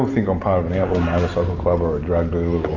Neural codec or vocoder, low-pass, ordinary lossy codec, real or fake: none; 7.2 kHz; AAC, 48 kbps; real